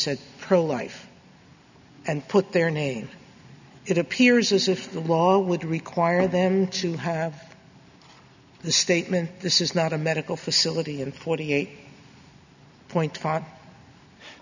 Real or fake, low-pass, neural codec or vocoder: real; 7.2 kHz; none